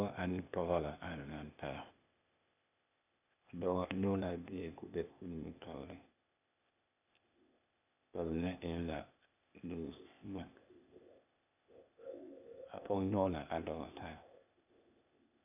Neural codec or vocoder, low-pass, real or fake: codec, 16 kHz, 0.8 kbps, ZipCodec; 3.6 kHz; fake